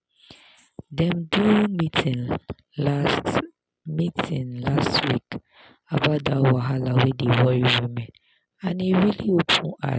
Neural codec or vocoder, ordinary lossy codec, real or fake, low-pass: none; none; real; none